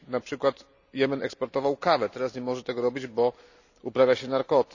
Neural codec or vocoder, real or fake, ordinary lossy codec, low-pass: none; real; none; 7.2 kHz